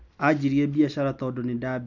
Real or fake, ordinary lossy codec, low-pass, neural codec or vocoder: real; none; 7.2 kHz; none